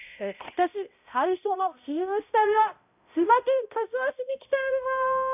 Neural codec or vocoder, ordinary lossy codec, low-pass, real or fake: codec, 16 kHz, 0.5 kbps, X-Codec, HuBERT features, trained on balanced general audio; none; 3.6 kHz; fake